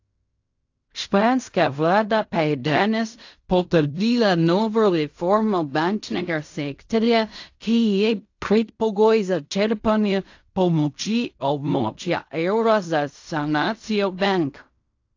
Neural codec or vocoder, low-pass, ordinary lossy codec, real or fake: codec, 16 kHz in and 24 kHz out, 0.4 kbps, LongCat-Audio-Codec, fine tuned four codebook decoder; 7.2 kHz; AAC, 48 kbps; fake